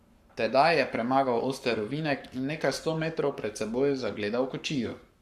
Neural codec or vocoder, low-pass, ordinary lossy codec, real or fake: codec, 44.1 kHz, 7.8 kbps, Pupu-Codec; 14.4 kHz; Opus, 64 kbps; fake